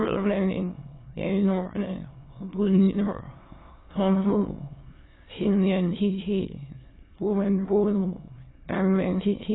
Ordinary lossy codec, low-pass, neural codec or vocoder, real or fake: AAC, 16 kbps; 7.2 kHz; autoencoder, 22.05 kHz, a latent of 192 numbers a frame, VITS, trained on many speakers; fake